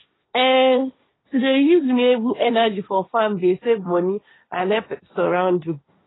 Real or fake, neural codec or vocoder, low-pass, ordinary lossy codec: fake; codec, 16 kHz, 1.1 kbps, Voila-Tokenizer; 7.2 kHz; AAC, 16 kbps